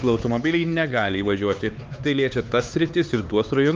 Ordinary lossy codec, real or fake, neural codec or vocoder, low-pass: Opus, 32 kbps; fake; codec, 16 kHz, 4 kbps, X-Codec, HuBERT features, trained on LibriSpeech; 7.2 kHz